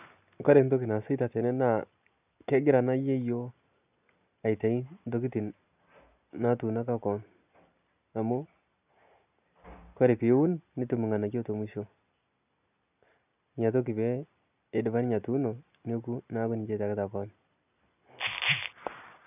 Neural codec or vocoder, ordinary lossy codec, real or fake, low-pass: none; none; real; 3.6 kHz